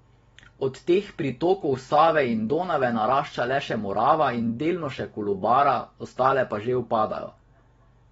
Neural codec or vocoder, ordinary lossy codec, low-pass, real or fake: none; AAC, 24 kbps; 19.8 kHz; real